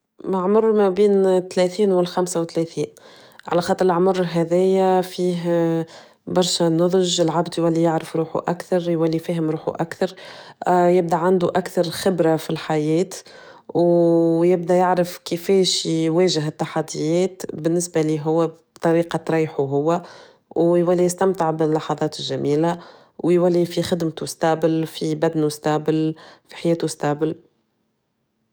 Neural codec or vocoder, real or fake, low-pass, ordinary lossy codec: codec, 44.1 kHz, 7.8 kbps, DAC; fake; none; none